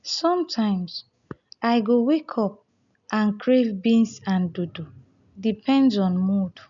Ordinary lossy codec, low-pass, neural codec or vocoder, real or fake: none; 7.2 kHz; none; real